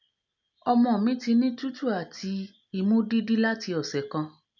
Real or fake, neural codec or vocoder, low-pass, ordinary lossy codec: real; none; 7.2 kHz; none